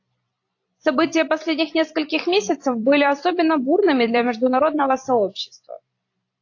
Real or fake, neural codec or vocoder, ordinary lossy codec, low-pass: fake; vocoder, 44.1 kHz, 128 mel bands every 256 samples, BigVGAN v2; AAC, 48 kbps; 7.2 kHz